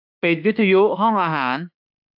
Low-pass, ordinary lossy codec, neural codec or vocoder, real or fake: 5.4 kHz; AAC, 48 kbps; autoencoder, 48 kHz, 32 numbers a frame, DAC-VAE, trained on Japanese speech; fake